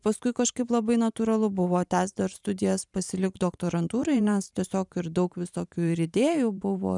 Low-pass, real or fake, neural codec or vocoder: 10.8 kHz; fake; vocoder, 44.1 kHz, 128 mel bands every 512 samples, BigVGAN v2